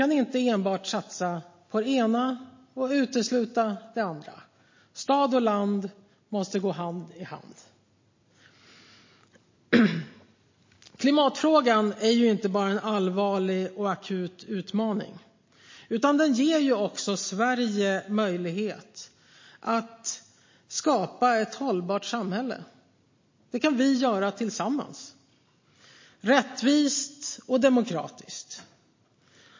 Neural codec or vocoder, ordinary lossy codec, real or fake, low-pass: none; MP3, 32 kbps; real; 7.2 kHz